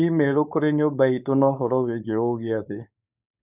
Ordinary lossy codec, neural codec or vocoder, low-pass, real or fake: none; codec, 16 kHz, 4.8 kbps, FACodec; 3.6 kHz; fake